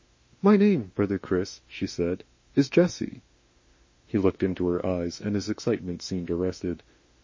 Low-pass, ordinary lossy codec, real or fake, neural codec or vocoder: 7.2 kHz; MP3, 32 kbps; fake; autoencoder, 48 kHz, 32 numbers a frame, DAC-VAE, trained on Japanese speech